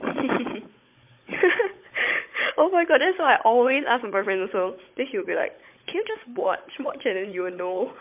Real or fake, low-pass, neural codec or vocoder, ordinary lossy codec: fake; 3.6 kHz; codec, 16 kHz, 16 kbps, FunCodec, trained on Chinese and English, 50 frames a second; MP3, 32 kbps